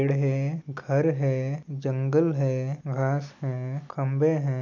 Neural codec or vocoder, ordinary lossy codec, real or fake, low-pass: none; none; real; 7.2 kHz